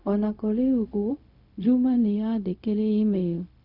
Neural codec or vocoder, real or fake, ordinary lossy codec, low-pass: codec, 16 kHz, 0.4 kbps, LongCat-Audio-Codec; fake; none; 5.4 kHz